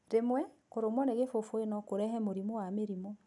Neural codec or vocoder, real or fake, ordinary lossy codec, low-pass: none; real; none; 10.8 kHz